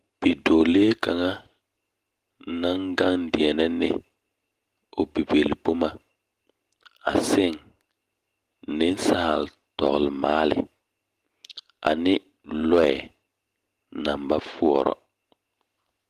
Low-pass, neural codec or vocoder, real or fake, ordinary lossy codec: 14.4 kHz; none; real; Opus, 24 kbps